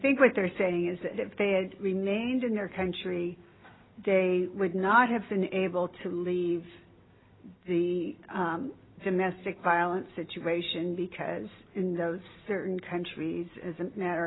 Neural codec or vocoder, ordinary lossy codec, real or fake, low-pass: none; AAC, 16 kbps; real; 7.2 kHz